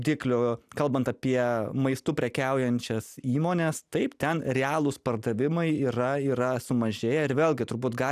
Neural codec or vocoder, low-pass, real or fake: none; 14.4 kHz; real